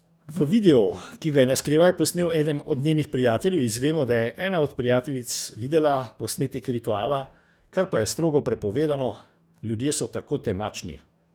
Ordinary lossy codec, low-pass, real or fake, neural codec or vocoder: none; none; fake; codec, 44.1 kHz, 2.6 kbps, DAC